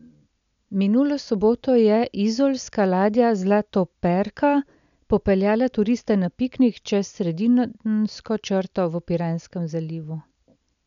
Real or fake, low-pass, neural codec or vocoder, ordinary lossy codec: real; 7.2 kHz; none; none